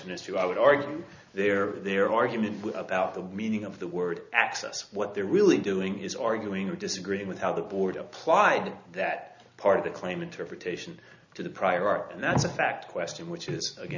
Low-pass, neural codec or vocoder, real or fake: 7.2 kHz; none; real